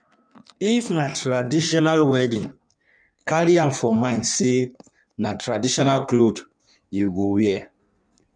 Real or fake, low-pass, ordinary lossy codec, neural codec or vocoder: fake; 9.9 kHz; none; codec, 16 kHz in and 24 kHz out, 1.1 kbps, FireRedTTS-2 codec